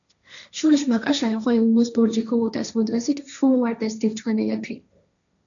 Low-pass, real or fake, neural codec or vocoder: 7.2 kHz; fake; codec, 16 kHz, 1.1 kbps, Voila-Tokenizer